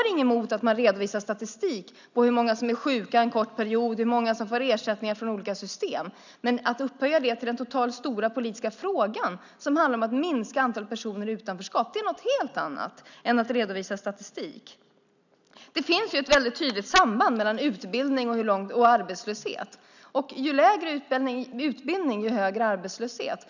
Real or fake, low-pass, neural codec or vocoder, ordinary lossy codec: real; 7.2 kHz; none; none